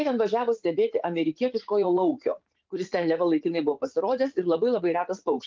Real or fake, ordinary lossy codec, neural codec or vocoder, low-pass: fake; Opus, 32 kbps; vocoder, 44.1 kHz, 80 mel bands, Vocos; 7.2 kHz